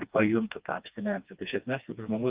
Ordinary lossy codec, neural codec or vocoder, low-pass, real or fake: Opus, 24 kbps; codec, 16 kHz, 2 kbps, FreqCodec, smaller model; 3.6 kHz; fake